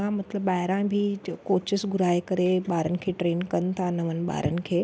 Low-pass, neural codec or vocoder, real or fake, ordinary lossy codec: none; none; real; none